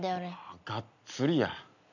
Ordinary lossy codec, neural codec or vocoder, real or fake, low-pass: none; none; real; 7.2 kHz